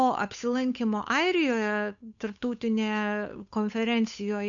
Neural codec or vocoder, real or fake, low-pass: codec, 16 kHz, 8 kbps, FunCodec, trained on Chinese and English, 25 frames a second; fake; 7.2 kHz